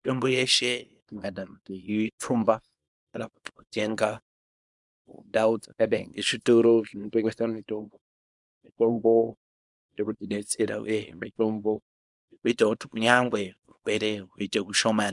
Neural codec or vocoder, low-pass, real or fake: codec, 24 kHz, 0.9 kbps, WavTokenizer, small release; 10.8 kHz; fake